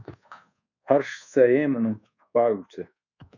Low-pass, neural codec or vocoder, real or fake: 7.2 kHz; codec, 24 kHz, 1.2 kbps, DualCodec; fake